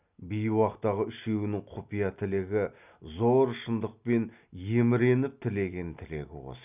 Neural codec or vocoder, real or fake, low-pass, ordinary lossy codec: none; real; 3.6 kHz; none